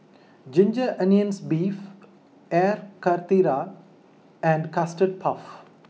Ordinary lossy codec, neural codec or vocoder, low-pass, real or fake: none; none; none; real